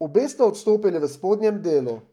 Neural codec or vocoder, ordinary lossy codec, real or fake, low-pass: codec, 44.1 kHz, 7.8 kbps, Pupu-Codec; none; fake; 19.8 kHz